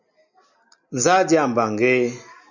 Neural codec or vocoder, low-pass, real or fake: none; 7.2 kHz; real